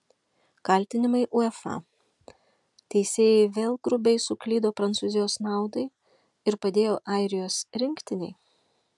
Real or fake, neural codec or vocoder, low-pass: real; none; 10.8 kHz